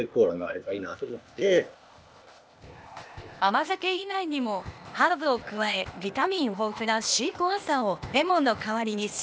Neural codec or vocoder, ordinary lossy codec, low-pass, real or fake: codec, 16 kHz, 0.8 kbps, ZipCodec; none; none; fake